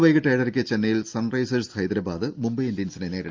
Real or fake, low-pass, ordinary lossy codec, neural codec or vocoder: real; 7.2 kHz; Opus, 24 kbps; none